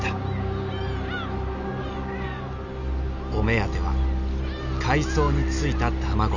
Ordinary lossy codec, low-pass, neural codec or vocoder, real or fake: none; 7.2 kHz; none; real